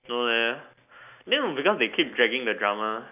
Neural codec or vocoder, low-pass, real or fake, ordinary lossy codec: none; 3.6 kHz; real; none